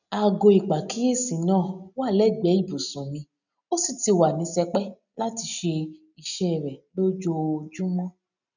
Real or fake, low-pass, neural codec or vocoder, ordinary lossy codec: real; 7.2 kHz; none; none